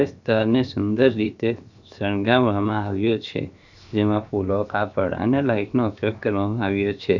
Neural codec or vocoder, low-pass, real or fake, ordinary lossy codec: codec, 16 kHz, 0.7 kbps, FocalCodec; 7.2 kHz; fake; none